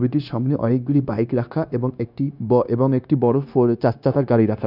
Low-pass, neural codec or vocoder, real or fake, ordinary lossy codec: 5.4 kHz; codec, 16 kHz, 0.9 kbps, LongCat-Audio-Codec; fake; none